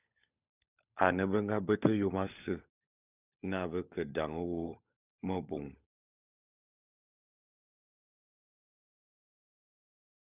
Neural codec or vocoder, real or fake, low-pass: codec, 16 kHz, 16 kbps, FunCodec, trained on LibriTTS, 50 frames a second; fake; 3.6 kHz